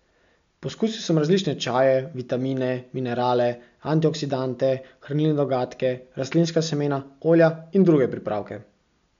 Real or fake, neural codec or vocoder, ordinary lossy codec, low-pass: real; none; MP3, 64 kbps; 7.2 kHz